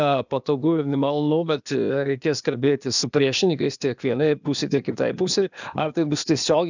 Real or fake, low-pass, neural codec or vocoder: fake; 7.2 kHz; codec, 16 kHz, 0.8 kbps, ZipCodec